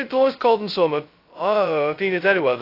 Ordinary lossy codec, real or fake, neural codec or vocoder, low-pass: AAC, 32 kbps; fake; codec, 16 kHz, 0.2 kbps, FocalCodec; 5.4 kHz